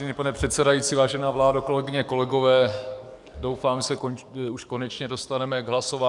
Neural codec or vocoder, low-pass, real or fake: codec, 44.1 kHz, 7.8 kbps, DAC; 10.8 kHz; fake